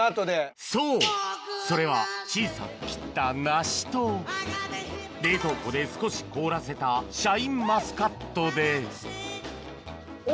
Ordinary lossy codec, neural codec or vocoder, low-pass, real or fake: none; none; none; real